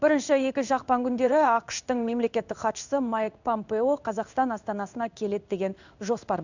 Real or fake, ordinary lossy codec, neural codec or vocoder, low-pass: fake; none; codec, 16 kHz in and 24 kHz out, 1 kbps, XY-Tokenizer; 7.2 kHz